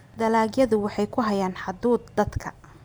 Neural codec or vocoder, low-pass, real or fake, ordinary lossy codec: vocoder, 44.1 kHz, 128 mel bands every 512 samples, BigVGAN v2; none; fake; none